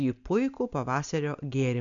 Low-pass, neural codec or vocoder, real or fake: 7.2 kHz; none; real